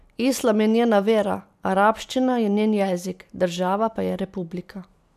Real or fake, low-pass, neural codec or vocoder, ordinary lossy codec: real; 14.4 kHz; none; none